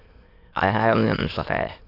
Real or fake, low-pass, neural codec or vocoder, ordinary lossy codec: fake; 5.4 kHz; autoencoder, 22.05 kHz, a latent of 192 numbers a frame, VITS, trained on many speakers; AAC, 32 kbps